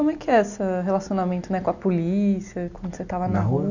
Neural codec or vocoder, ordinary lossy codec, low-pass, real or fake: none; none; 7.2 kHz; real